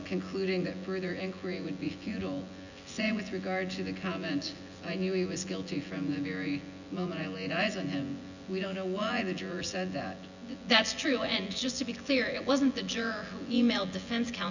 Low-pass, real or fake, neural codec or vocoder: 7.2 kHz; fake; vocoder, 24 kHz, 100 mel bands, Vocos